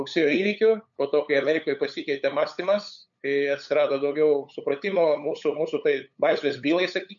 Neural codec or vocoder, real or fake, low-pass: codec, 16 kHz, 8 kbps, FunCodec, trained on LibriTTS, 25 frames a second; fake; 7.2 kHz